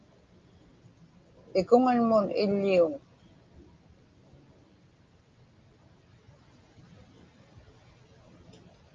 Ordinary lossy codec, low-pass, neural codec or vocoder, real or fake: Opus, 24 kbps; 7.2 kHz; none; real